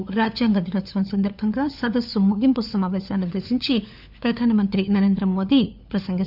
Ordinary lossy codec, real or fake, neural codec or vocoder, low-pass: none; fake; codec, 16 kHz, 4 kbps, FunCodec, trained on LibriTTS, 50 frames a second; 5.4 kHz